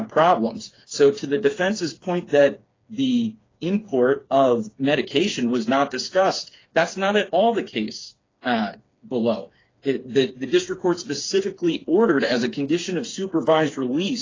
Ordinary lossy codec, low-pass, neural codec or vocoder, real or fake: AAC, 32 kbps; 7.2 kHz; codec, 16 kHz, 4 kbps, FreqCodec, smaller model; fake